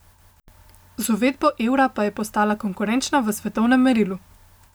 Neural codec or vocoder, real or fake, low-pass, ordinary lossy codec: none; real; none; none